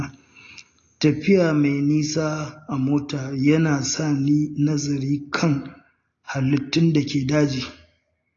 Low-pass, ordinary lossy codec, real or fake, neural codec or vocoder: 7.2 kHz; AAC, 32 kbps; real; none